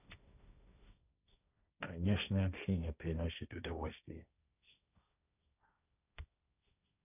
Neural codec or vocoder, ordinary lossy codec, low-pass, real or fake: codec, 16 kHz, 1.1 kbps, Voila-Tokenizer; none; 3.6 kHz; fake